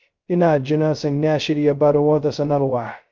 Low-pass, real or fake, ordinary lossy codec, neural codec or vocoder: 7.2 kHz; fake; Opus, 24 kbps; codec, 16 kHz, 0.2 kbps, FocalCodec